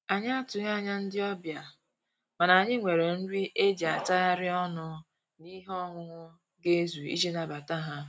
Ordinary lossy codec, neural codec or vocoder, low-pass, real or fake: none; none; none; real